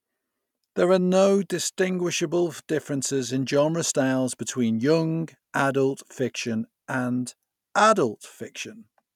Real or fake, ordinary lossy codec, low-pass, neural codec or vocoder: real; none; 19.8 kHz; none